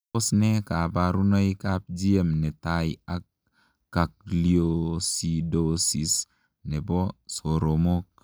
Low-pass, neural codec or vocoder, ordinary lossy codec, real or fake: none; none; none; real